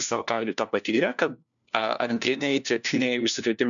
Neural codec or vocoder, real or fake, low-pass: codec, 16 kHz, 1 kbps, FunCodec, trained on LibriTTS, 50 frames a second; fake; 7.2 kHz